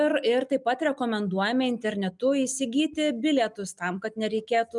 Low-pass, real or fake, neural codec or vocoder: 10.8 kHz; real; none